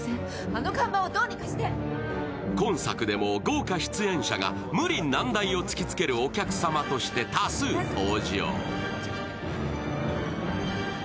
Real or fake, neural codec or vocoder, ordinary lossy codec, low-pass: real; none; none; none